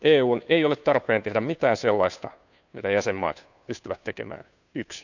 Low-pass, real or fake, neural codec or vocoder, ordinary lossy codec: 7.2 kHz; fake; codec, 16 kHz, 2 kbps, FunCodec, trained on Chinese and English, 25 frames a second; none